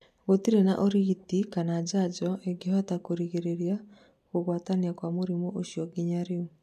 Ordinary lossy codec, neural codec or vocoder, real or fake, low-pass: none; none; real; 14.4 kHz